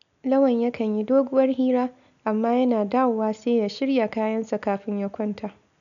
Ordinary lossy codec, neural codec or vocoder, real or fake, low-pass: none; none; real; 7.2 kHz